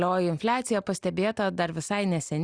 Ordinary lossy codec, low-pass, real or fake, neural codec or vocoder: Opus, 64 kbps; 9.9 kHz; real; none